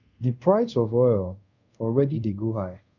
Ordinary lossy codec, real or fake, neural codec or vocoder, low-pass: none; fake; codec, 24 kHz, 0.5 kbps, DualCodec; 7.2 kHz